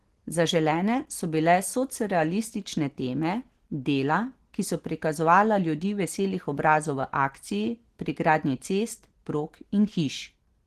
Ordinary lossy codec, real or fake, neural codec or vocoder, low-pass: Opus, 16 kbps; real; none; 14.4 kHz